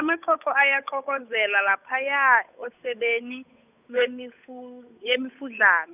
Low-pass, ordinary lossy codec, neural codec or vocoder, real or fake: 3.6 kHz; none; codec, 16 kHz, 8 kbps, FunCodec, trained on Chinese and English, 25 frames a second; fake